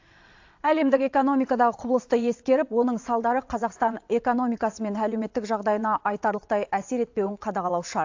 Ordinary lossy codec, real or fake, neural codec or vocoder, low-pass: AAC, 48 kbps; fake; vocoder, 22.05 kHz, 80 mel bands, WaveNeXt; 7.2 kHz